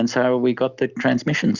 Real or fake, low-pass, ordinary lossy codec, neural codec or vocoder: real; 7.2 kHz; Opus, 64 kbps; none